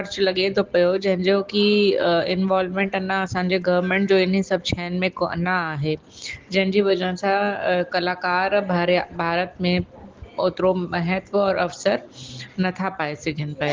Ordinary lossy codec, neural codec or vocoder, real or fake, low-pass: Opus, 16 kbps; none; real; 7.2 kHz